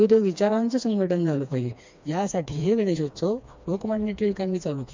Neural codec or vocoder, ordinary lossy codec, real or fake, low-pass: codec, 16 kHz, 2 kbps, FreqCodec, smaller model; MP3, 64 kbps; fake; 7.2 kHz